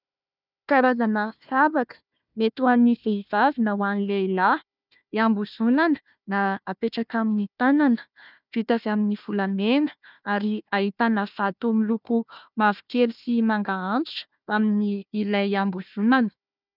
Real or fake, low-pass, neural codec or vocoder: fake; 5.4 kHz; codec, 16 kHz, 1 kbps, FunCodec, trained on Chinese and English, 50 frames a second